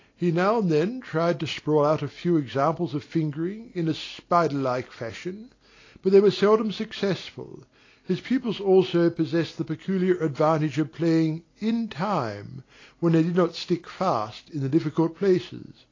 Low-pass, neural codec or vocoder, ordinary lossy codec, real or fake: 7.2 kHz; none; AAC, 32 kbps; real